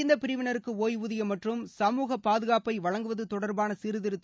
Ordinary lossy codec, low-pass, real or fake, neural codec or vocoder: none; none; real; none